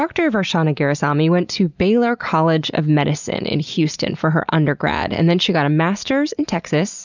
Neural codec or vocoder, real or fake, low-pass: none; real; 7.2 kHz